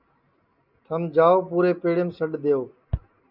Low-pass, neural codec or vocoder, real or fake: 5.4 kHz; none; real